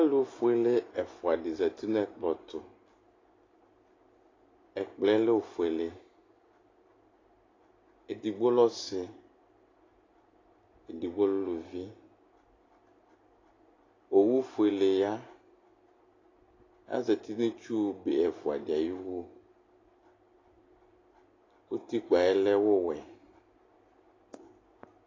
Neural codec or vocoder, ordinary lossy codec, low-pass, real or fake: none; AAC, 32 kbps; 7.2 kHz; real